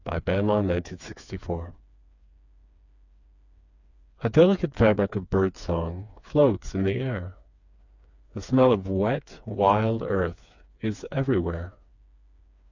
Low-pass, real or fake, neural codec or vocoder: 7.2 kHz; fake; codec, 16 kHz, 4 kbps, FreqCodec, smaller model